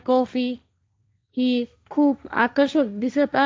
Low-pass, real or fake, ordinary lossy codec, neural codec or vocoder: none; fake; none; codec, 16 kHz, 1.1 kbps, Voila-Tokenizer